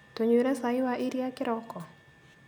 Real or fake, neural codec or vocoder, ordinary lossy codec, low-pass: real; none; none; none